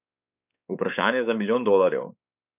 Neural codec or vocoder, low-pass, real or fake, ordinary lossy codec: codec, 16 kHz, 4 kbps, X-Codec, WavLM features, trained on Multilingual LibriSpeech; 3.6 kHz; fake; none